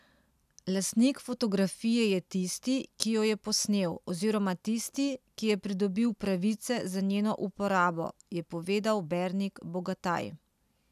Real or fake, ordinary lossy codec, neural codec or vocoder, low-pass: real; none; none; 14.4 kHz